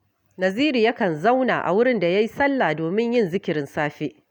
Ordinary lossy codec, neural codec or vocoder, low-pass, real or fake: none; none; 19.8 kHz; real